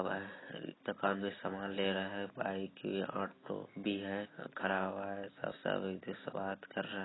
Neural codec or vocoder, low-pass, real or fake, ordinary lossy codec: none; 7.2 kHz; real; AAC, 16 kbps